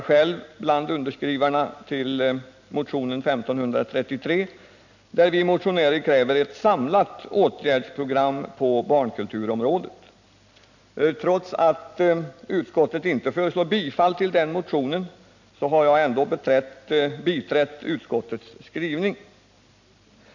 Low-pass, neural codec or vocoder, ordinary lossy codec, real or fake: 7.2 kHz; none; none; real